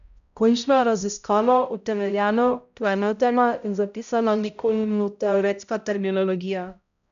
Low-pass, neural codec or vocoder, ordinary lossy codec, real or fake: 7.2 kHz; codec, 16 kHz, 0.5 kbps, X-Codec, HuBERT features, trained on balanced general audio; none; fake